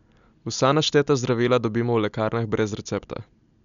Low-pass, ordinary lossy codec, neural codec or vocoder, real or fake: 7.2 kHz; none; none; real